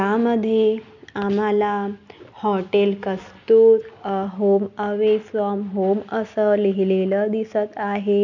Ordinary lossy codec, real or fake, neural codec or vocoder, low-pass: none; real; none; 7.2 kHz